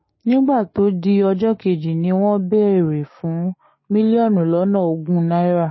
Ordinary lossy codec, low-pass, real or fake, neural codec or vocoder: MP3, 24 kbps; 7.2 kHz; fake; codec, 16 kHz, 6 kbps, DAC